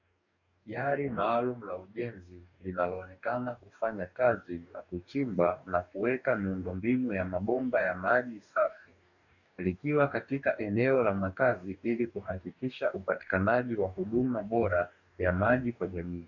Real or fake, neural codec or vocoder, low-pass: fake; codec, 44.1 kHz, 2.6 kbps, DAC; 7.2 kHz